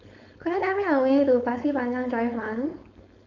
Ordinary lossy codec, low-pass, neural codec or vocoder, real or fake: AAC, 48 kbps; 7.2 kHz; codec, 16 kHz, 4.8 kbps, FACodec; fake